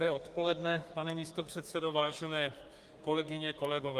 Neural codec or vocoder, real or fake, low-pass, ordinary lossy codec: codec, 32 kHz, 1.9 kbps, SNAC; fake; 14.4 kHz; Opus, 32 kbps